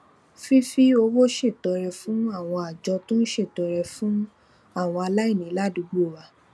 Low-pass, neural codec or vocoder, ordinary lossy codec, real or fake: none; none; none; real